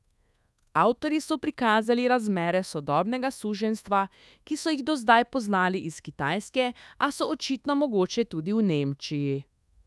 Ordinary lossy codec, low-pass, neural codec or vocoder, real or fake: none; none; codec, 24 kHz, 1.2 kbps, DualCodec; fake